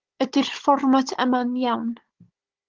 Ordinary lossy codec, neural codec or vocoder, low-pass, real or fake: Opus, 16 kbps; codec, 16 kHz, 16 kbps, FunCodec, trained on Chinese and English, 50 frames a second; 7.2 kHz; fake